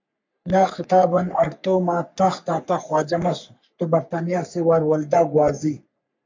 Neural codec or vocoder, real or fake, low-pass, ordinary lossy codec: codec, 44.1 kHz, 3.4 kbps, Pupu-Codec; fake; 7.2 kHz; MP3, 64 kbps